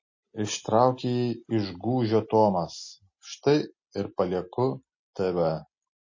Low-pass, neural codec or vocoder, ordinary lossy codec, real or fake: 7.2 kHz; none; MP3, 32 kbps; real